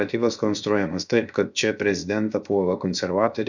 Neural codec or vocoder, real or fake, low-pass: codec, 16 kHz, 0.7 kbps, FocalCodec; fake; 7.2 kHz